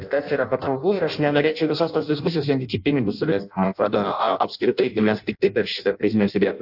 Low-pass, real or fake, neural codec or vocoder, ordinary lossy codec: 5.4 kHz; fake; codec, 16 kHz in and 24 kHz out, 0.6 kbps, FireRedTTS-2 codec; AAC, 48 kbps